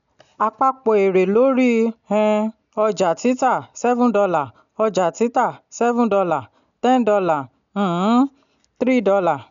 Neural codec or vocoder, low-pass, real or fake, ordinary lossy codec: none; 7.2 kHz; real; none